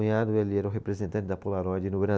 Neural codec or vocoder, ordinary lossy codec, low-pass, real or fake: none; none; none; real